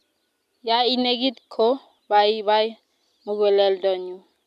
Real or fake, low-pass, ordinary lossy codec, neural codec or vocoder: real; 14.4 kHz; none; none